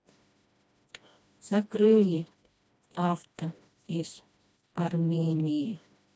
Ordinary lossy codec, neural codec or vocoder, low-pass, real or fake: none; codec, 16 kHz, 1 kbps, FreqCodec, smaller model; none; fake